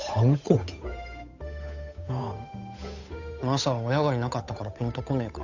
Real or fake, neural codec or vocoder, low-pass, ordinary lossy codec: fake; codec, 16 kHz, 8 kbps, FunCodec, trained on Chinese and English, 25 frames a second; 7.2 kHz; none